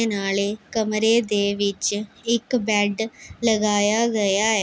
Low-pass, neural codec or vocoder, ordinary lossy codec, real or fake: none; none; none; real